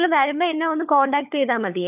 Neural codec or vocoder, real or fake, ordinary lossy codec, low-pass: codec, 16 kHz, 4 kbps, FunCodec, trained on LibriTTS, 50 frames a second; fake; none; 3.6 kHz